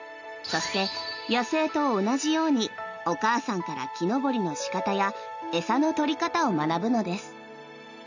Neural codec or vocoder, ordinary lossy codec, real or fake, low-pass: none; none; real; 7.2 kHz